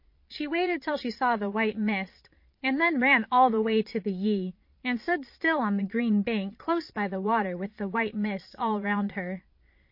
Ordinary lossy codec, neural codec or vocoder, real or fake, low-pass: MP3, 32 kbps; vocoder, 22.05 kHz, 80 mel bands, Vocos; fake; 5.4 kHz